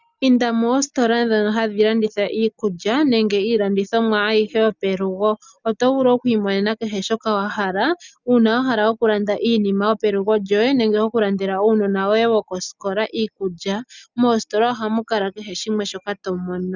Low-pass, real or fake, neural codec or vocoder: 7.2 kHz; real; none